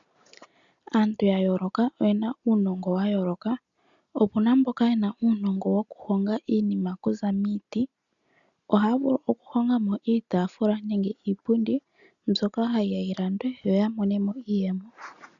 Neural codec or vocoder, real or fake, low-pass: none; real; 7.2 kHz